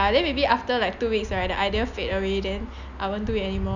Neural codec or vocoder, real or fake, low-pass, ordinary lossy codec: none; real; 7.2 kHz; none